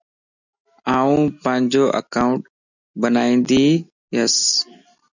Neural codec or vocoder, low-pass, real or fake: none; 7.2 kHz; real